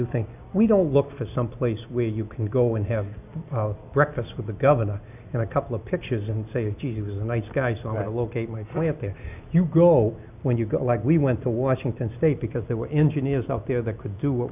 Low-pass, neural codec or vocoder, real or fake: 3.6 kHz; none; real